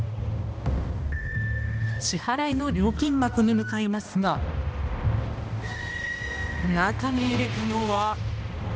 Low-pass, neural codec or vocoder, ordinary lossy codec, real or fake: none; codec, 16 kHz, 1 kbps, X-Codec, HuBERT features, trained on balanced general audio; none; fake